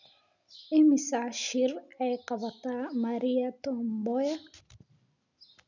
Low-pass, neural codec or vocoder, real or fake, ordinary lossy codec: 7.2 kHz; none; real; none